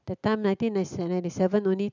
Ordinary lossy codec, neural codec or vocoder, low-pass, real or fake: none; none; 7.2 kHz; real